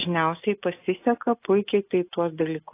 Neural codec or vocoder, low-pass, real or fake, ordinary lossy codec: none; 3.6 kHz; real; AAC, 24 kbps